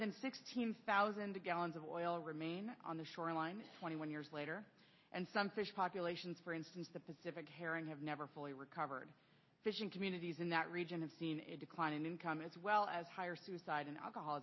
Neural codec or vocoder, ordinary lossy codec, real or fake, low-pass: none; MP3, 24 kbps; real; 7.2 kHz